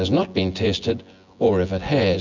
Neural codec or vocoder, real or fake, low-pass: vocoder, 24 kHz, 100 mel bands, Vocos; fake; 7.2 kHz